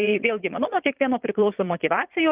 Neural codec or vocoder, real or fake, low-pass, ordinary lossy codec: vocoder, 22.05 kHz, 80 mel bands, Vocos; fake; 3.6 kHz; Opus, 24 kbps